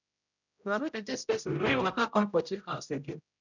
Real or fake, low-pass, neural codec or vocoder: fake; 7.2 kHz; codec, 16 kHz, 0.5 kbps, X-Codec, HuBERT features, trained on general audio